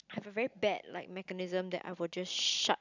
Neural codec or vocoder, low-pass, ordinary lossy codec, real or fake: none; 7.2 kHz; none; real